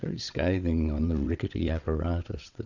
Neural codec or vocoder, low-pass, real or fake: vocoder, 22.05 kHz, 80 mel bands, WaveNeXt; 7.2 kHz; fake